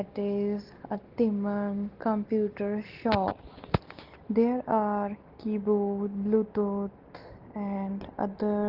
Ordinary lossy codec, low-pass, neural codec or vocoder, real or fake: Opus, 16 kbps; 5.4 kHz; none; real